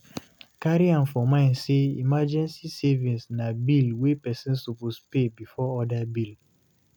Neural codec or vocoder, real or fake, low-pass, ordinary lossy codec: none; real; none; none